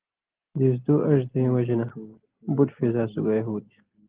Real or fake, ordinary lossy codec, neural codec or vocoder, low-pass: real; Opus, 16 kbps; none; 3.6 kHz